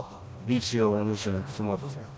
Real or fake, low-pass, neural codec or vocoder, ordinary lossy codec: fake; none; codec, 16 kHz, 1 kbps, FreqCodec, smaller model; none